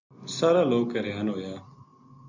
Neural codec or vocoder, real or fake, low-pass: none; real; 7.2 kHz